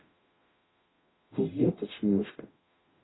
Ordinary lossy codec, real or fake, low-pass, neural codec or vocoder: AAC, 16 kbps; fake; 7.2 kHz; codec, 44.1 kHz, 0.9 kbps, DAC